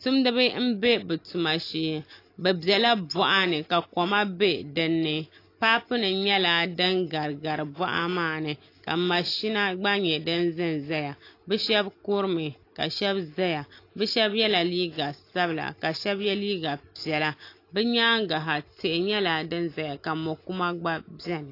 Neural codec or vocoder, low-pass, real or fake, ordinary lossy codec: none; 5.4 kHz; real; AAC, 32 kbps